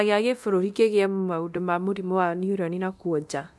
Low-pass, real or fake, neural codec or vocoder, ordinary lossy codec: none; fake; codec, 24 kHz, 0.9 kbps, DualCodec; none